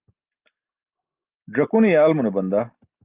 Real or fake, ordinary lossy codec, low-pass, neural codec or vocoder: real; Opus, 32 kbps; 3.6 kHz; none